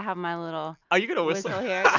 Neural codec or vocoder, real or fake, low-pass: none; real; 7.2 kHz